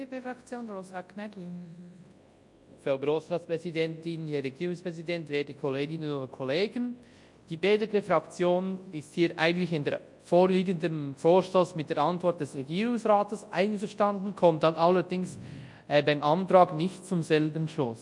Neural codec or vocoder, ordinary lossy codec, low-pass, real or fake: codec, 24 kHz, 0.9 kbps, WavTokenizer, large speech release; MP3, 48 kbps; 10.8 kHz; fake